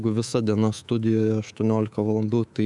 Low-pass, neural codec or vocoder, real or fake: 10.8 kHz; codec, 24 kHz, 3.1 kbps, DualCodec; fake